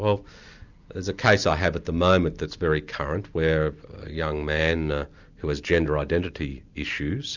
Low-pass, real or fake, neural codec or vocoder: 7.2 kHz; real; none